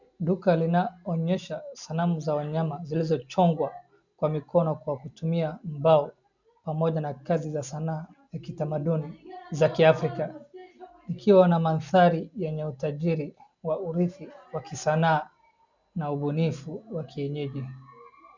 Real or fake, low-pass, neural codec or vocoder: real; 7.2 kHz; none